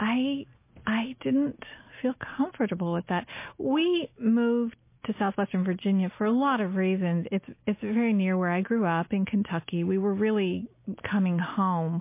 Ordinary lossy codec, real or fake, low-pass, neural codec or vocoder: MP3, 24 kbps; real; 3.6 kHz; none